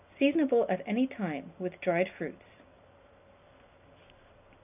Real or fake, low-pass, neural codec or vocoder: real; 3.6 kHz; none